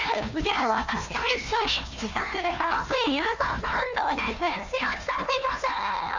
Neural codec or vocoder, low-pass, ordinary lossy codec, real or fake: codec, 16 kHz, 1 kbps, FunCodec, trained on Chinese and English, 50 frames a second; 7.2 kHz; Opus, 64 kbps; fake